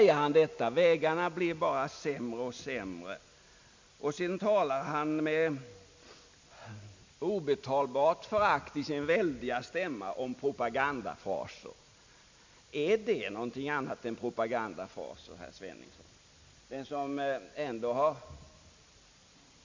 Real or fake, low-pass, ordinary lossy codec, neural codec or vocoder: real; 7.2 kHz; MP3, 64 kbps; none